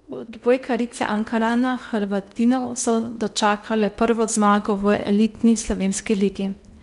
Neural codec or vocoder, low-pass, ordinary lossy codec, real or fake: codec, 16 kHz in and 24 kHz out, 0.8 kbps, FocalCodec, streaming, 65536 codes; 10.8 kHz; none; fake